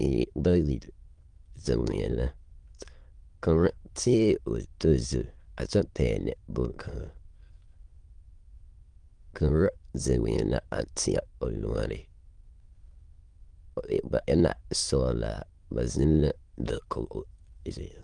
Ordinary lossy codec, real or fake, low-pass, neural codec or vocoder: Opus, 24 kbps; fake; 9.9 kHz; autoencoder, 22.05 kHz, a latent of 192 numbers a frame, VITS, trained on many speakers